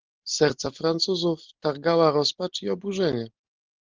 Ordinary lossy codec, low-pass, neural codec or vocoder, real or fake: Opus, 16 kbps; 7.2 kHz; none; real